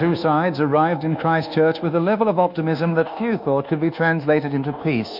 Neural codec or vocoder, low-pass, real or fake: codec, 24 kHz, 1.2 kbps, DualCodec; 5.4 kHz; fake